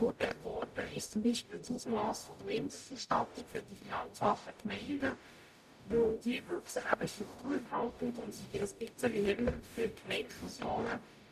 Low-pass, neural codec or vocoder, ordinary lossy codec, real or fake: 14.4 kHz; codec, 44.1 kHz, 0.9 kbps, DAC; none; fake